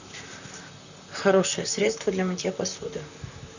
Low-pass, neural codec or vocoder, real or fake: 7.2 kHz; vocoder, 44.1 kHz, 128 mel bands, Pupu-Vocoder; fake